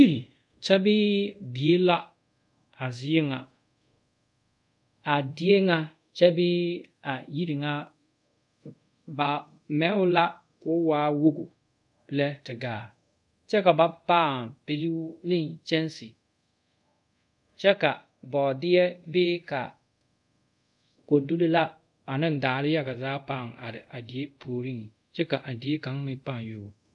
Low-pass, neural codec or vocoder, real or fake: 10.8 kHz; codec, 24 kHz, 0.5 kbps, DualCodec; fake